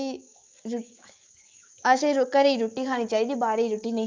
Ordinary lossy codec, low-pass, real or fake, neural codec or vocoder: Opus, 32 kbps; 7.2 kHz; fake; autoencoder, 48 kHz, 128 numbers a frame, DAC-VAE, trained on Japanese speech